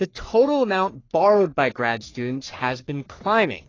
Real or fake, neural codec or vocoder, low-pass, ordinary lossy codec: fake; codec, 44.1 kHz, 3.4 kbps, Pupu-Codec; 7.2 kHz; AAC, 32 kbps